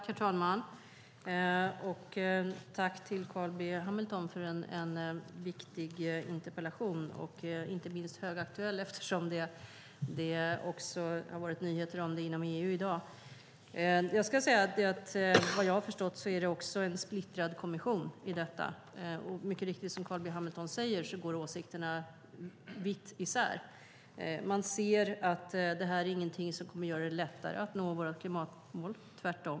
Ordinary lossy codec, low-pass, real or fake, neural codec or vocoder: none; none; real; none